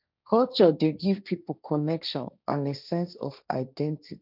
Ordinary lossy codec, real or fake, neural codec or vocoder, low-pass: none; fake; codec, 16 kHz, 1.1 kbps, Voila-Tokenizer; 5.4 kHz